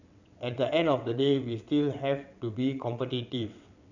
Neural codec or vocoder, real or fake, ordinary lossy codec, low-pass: vocoder, 22.05 kHz, 80 mel bands, WaveNeXt; fake; none; 7.2 kHz